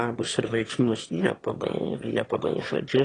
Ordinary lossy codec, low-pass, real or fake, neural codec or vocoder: AAC, 32 kbps; 9.9 kHz; fake; autoencoder, 22.05 kHz, a latent of 192 numbers a frame, VITS, trained on one speaker